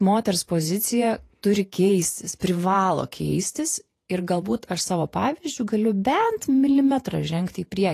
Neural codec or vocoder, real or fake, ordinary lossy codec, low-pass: vocoder, 48 kHz, 128 mel bands, Vocos; fake; AAC, 48 kbps; 14.4 kHz